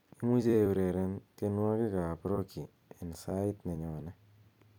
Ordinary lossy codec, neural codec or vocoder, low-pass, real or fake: none; vocoder, 44.1 kHz, 128 mel bands every 256 samples, BigVGAN v2; 19.8 kHz; fake